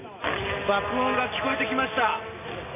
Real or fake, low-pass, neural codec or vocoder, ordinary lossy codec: real; 3.6 kHz; none; none